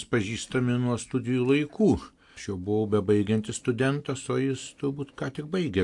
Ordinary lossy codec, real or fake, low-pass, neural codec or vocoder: AAC, 64 kbps; real; 10.8 kHz; none